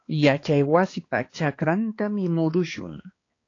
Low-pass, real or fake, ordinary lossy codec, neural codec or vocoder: 7.2 kHz; fake; AAC, 32 kbps; codec, 16 kHz, 2 kbps, X-Codec, HuBERT features, trained on balanced general audio